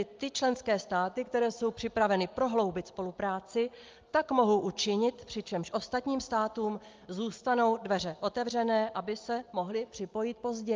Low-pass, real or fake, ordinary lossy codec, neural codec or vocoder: 7.2 kHz; real; Opus, 24 kbps; none